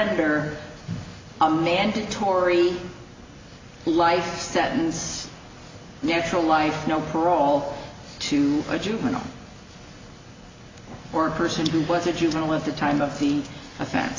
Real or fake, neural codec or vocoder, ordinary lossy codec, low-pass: real; none; AAC, 32 kbps; 7.2 kHz